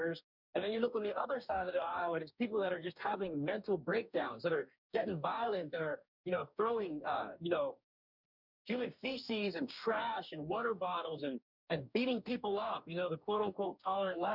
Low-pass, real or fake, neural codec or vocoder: 5.4 kHz; fake; codec, 44.1 kHz, 2.6 kbps, DAC